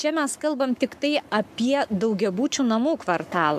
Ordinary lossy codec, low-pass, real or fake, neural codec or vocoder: AAC, 96 kbps; 14.4 kHz; fake; codec, 44.1 kHz, 7.8 kbps, Pupu-Codec